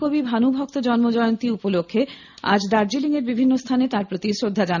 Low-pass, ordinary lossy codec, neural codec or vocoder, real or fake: 7.2 kHz; none; none; real